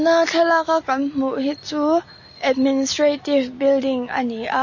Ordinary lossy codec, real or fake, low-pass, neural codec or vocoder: MP3, 32 kbps; real; 7.2 kHz; none